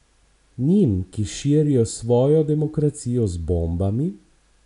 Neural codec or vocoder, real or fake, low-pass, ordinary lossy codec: none; real; 10.8 kHz; none